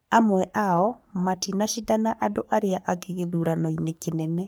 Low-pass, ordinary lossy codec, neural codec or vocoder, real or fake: none; none; codec, 44.1 kHz, 3.4 kbps, Pupu-Codec; fake